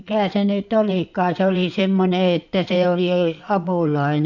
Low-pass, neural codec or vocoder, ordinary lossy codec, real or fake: 7.2 kHz; codec, 16 kHz in and 24 kHz out, 2.2 kbps, FireRedTTS-2 codec; none; fake